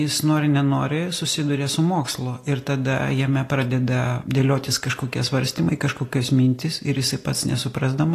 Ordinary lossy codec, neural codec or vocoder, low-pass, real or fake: AAC, 48 kbps; none; 14.4 kHz; real